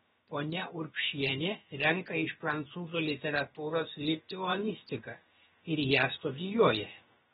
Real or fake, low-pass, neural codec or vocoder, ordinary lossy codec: fake; 7.2 kHz; codec, 16 kHz, about 1 kbps, DyCAST, with the encoder's durations; AAC, 16 kbps